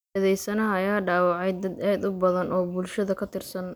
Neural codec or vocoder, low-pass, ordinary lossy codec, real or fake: none; none; none; real